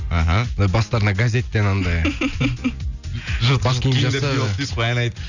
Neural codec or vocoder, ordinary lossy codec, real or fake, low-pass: none; none; real; 7.2 kHz